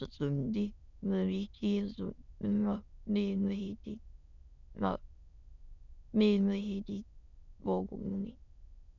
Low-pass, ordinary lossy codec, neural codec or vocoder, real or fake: 7.2 kHz; none; autoencoder, 22.05 kHz, a latent of 192 numbers a frame, VITS, trained on many speakers; fake